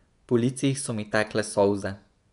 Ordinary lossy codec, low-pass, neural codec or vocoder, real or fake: none; 10.8 kHz; vocoder, 24 kHz, 100 mel bands, Vocos; fake